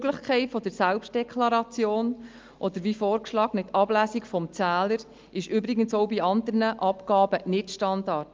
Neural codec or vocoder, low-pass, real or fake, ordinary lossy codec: none; 7.2 kHz; real; Opus, 24 kbps